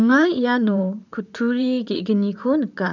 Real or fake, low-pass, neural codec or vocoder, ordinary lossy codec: fake; 7.2 kHz; vocoder, 44.1 kHz, 128 mel bands, Pupu-Vocoder; none